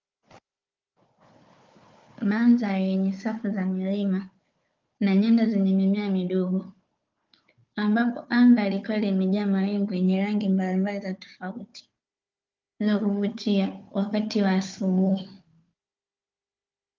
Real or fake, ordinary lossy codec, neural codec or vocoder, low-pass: fake; Opus, 24 kbps; codec, 16 kHz, 4 kbps, FunCodec, trained on Chinese and English, 50 frames a second; 7.2 kHz